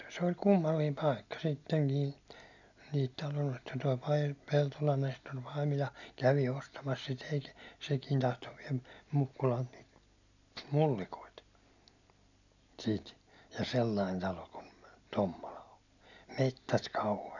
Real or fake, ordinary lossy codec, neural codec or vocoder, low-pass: real; none; none; 7.2 kHz